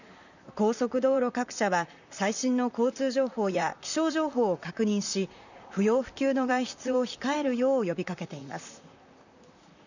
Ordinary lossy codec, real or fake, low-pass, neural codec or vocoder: none; fake; 7.2 kHz; vocoder, 44.1 kHz, 128 mel bands, Pupu-Vocoder